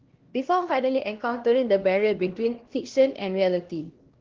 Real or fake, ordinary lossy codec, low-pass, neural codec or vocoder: fake; Opus, 16 kbps; 7.2 kHz; codec, 16 kHz, 1 kbps, X-Codec, HuBERT features, trained on LibriSpeech